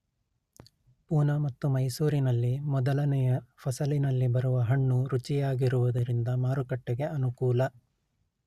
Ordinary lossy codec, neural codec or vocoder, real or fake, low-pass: Opus, 64 kbps; none; real; 14.4 kHz